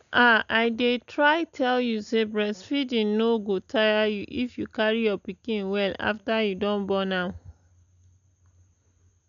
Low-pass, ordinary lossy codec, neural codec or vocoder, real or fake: 7.2 kHz; none; none; real